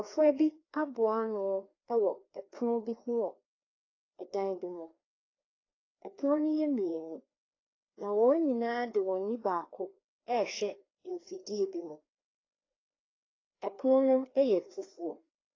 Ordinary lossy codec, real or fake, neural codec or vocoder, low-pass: AAC, 32 kbps; fake; codec, 16 kHz, 2 kbps, FreqCodec, larger model; 7.2 kHz